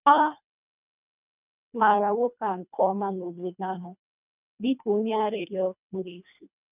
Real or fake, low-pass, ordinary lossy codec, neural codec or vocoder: fake; 3.6 kHz; none; codec, 24 kHz, 1.5 kbps, HILCodec